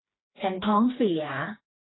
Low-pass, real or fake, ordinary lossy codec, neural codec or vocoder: 7.2 kHz; fake; AAC, 16 kbps; codec, 16 kHz, 2 kbps, FreqCodec, smaller model